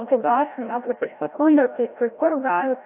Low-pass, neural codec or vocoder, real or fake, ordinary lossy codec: 3.6 kHz; codec, 16 kHz, 0.5 kbps, FreqCodec, larger model; fake; AAC, 32 kbps